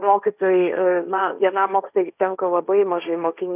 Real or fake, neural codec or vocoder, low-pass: fake; codec, 16 kHz, 1.1 kbps, Voila-Tokenizer; 3.6 kHz